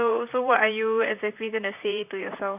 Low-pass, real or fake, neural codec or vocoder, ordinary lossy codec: 3.6 kHz; fake; vocoder, 44.1 kHz, 128 mel bands, Pupu-Vocoder; none